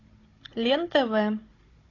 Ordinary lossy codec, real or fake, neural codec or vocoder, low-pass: AAC, 32 kbps; fake; codec, 16 kHz, 16 kbps, FunCodec, trained on Chinese and English, 50 frames a second; 7.2 kHz